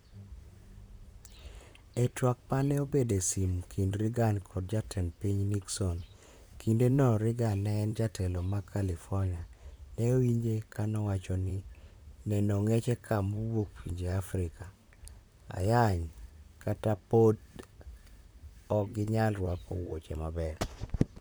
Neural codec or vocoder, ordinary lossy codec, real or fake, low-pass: vocoder, 44.1 kHz, 128 mel bands, Pupu-Vocoder; none; fake; none